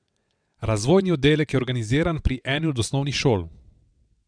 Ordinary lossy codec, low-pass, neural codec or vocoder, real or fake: none; 9.9 kHz; vocoder, 22.05 kHz, 80 mel bands, WaveNeXt; fake